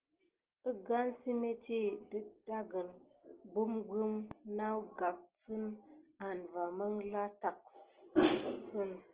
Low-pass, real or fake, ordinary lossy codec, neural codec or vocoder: 3.6 kHz; real; Opus, 16 kbps; none